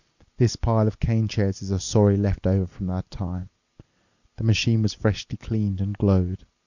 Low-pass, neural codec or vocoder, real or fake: 7.2 kHz; none; real